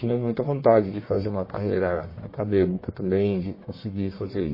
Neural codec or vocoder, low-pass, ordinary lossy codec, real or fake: codec, 44.1 kHz, 1.7 kbps, Pupu-Codec; 5.4 kHz; MP3, 24 kbps; fake